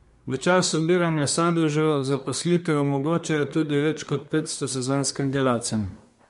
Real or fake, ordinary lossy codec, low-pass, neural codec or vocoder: fake; MP3, 64 kbps; 10.8 kHz; codec, 24 kHz, 1 kbps, SNAC